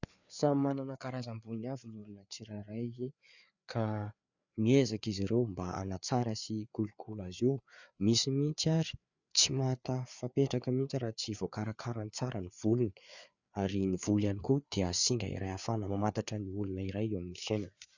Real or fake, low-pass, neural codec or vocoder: fake; 7.2 kHz; codec, 16 kHz, 4 kbps, FreqCodec, larger model